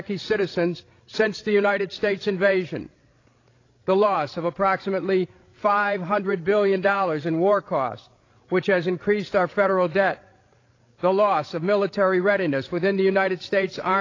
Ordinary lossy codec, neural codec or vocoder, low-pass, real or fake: AAC, 32 kbps; codec, 16 kHz, 16 kbps, FreqCodec, larger model; 7.2 kHz; fake